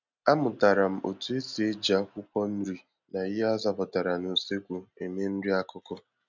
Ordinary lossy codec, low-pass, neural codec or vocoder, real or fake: none; 7.2 kHz; none; real